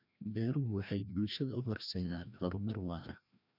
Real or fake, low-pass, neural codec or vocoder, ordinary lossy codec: fake; 5.4 kHz; codec, 16 kHz, 1 kbps, FreqCodec, larger model; none